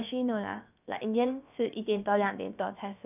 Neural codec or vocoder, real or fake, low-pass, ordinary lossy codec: codec, 16 kHz, about 1 kbps, DyCAST, with the encoder's durations; fake; 3.6 kHz; none